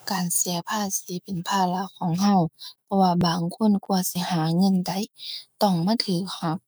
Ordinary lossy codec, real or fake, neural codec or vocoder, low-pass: none; fake; autoencoder, 48 kHz, 128 numbers a frame, DAC-VAE, trained on Japanese speech; none